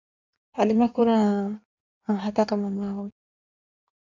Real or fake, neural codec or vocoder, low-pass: fake; codec, 16 kHz in and 24 kHz out, 1.1 kbps, FireRedTTS-2 codec; 7.2 kHz